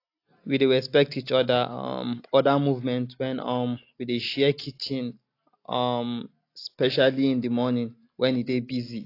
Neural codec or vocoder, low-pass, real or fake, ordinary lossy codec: none; 5.4 kHz; real; AAC, 32 kbps